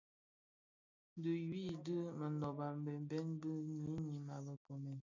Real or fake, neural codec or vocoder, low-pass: real; none; 7.2 kHz